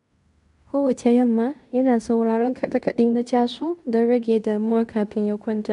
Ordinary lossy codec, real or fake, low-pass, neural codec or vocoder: none; fake; 10.8 kHz; codec, 16 kHz in and 24 kHz out, 0.9 kbps, LongCat-Audio-Codec, fine tuned four codebook decoder